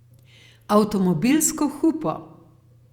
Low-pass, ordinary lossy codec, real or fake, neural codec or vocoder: 19.8 kHz; Opus, 64 kbps; fake; vocoder, 48 kHz, 128 mel bands, Vocos